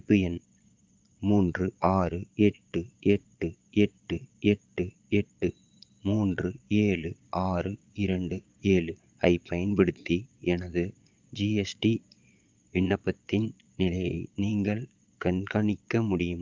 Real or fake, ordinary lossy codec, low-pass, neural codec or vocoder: fake; Opus, 24 kbps; 7.2 kHz; vocoder, 22.05 kHz, 80 mel bands, Vocos